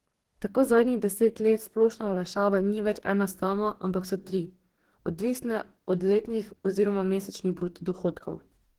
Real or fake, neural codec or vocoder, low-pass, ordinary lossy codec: fake; codec, 44.1 kHz, 2.6 kbps, DAC; 19.8 kHz; Opus, 16 kbps